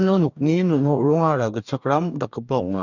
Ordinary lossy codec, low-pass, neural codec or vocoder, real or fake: none; 7.2 kHz; codec, 44.1 kHz, 2.6 kbps, DAC; fake